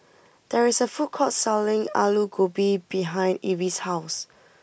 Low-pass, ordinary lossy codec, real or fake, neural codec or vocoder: none; none; real; none